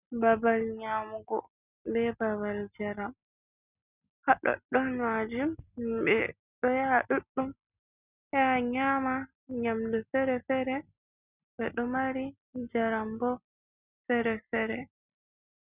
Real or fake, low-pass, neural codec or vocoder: real; 3.6 kHz; none